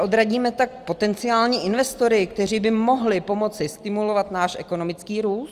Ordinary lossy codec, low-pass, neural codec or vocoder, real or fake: Opus, 32 kbps; 14.4 kHz; none; real